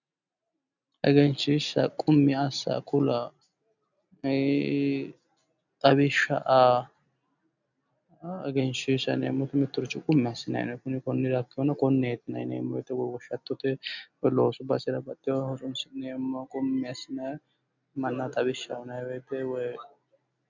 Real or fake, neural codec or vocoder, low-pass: real; none; 7.2 kHz